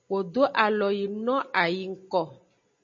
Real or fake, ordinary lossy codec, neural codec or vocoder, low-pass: real; MP3, 32 kbps; none; 7.2 kHz